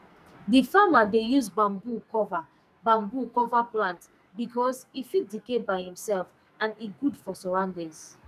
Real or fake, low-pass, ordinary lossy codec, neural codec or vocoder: fake; 14.4 kHz; none; codec, 44.1 kHz, 2.6 kbps, SNAC